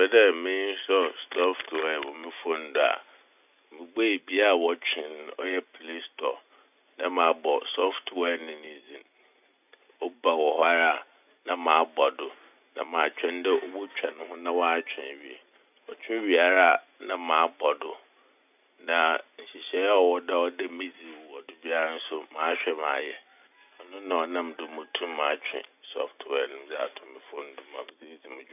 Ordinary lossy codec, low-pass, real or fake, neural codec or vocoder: none; 3.6 kHz; real; none